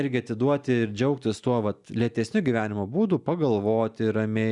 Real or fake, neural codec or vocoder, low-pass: real; none; 10.8 kHz